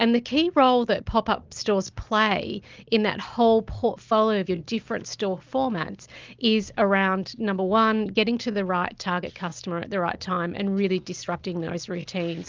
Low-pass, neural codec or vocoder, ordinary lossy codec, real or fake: 7.2 kHz; none; Opus, 24 kbps; real